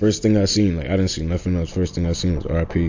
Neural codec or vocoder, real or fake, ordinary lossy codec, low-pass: none; real; AAC, 48 kbps; 7.2 kHz